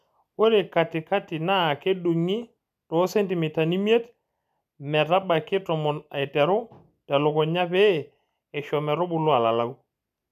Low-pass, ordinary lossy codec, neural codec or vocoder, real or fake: 14.4 kHz; none; none; real